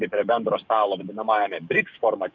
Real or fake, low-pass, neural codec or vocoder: fake; 7.2 kHz; codec, 44.1 kHz, 7.8 kbps, DAC